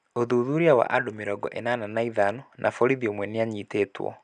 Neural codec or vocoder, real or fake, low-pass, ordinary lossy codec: none; real; 9.9 kHz; none